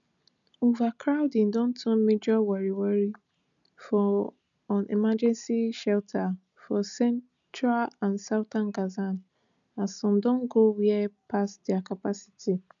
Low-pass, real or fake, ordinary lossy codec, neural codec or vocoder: 7.2 kHz; real; none; none